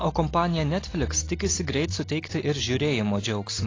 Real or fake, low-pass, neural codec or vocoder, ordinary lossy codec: real; 7.2 kHz; none; AAC, 32 kbps